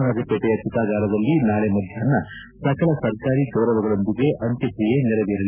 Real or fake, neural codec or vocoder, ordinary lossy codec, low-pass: real; none; none; 3.6 kHz